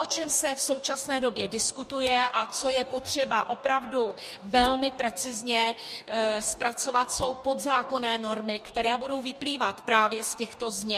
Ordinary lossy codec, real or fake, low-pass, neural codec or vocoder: MP3, 64 kbps; fake; 14.4 kHz; codec, 44.1 kHz, 2.6 kbps, DAC